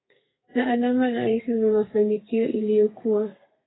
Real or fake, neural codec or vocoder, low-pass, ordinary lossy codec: fake; codec, 32 kHz, 1.9 kbps, SNAC; 7.2 kHz; AAC, 16 kbps